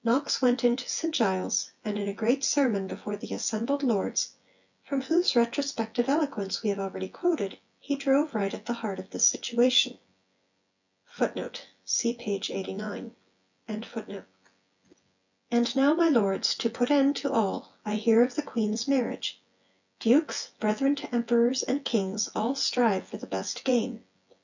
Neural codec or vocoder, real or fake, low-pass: vocoder, 24 kHz, 100 mel bands, Vocos; fake; 7.2 kHz